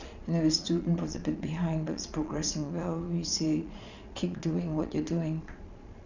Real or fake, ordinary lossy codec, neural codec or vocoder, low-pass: fake; none; vocoder, 22.05 kHz, 80 mel bands, Vocos; 7.2 kHz